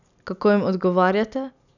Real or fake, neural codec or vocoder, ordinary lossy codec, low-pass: real; none; none; 7.2 kHz